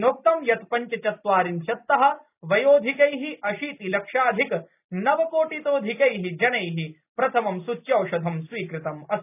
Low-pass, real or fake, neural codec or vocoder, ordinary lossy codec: 3.6 kHz; real; none; none